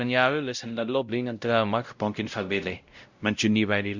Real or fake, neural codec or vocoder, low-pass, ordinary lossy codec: fake; codec, 16 kHz, 0.5 kbps, X-Codec, WavLM features, trained on Multilingual LibriSpeech; 7.2 kHz; Opus, 64 kbps